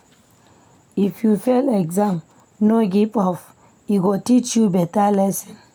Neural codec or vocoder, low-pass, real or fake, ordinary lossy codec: none; 19.8 kHz; real; none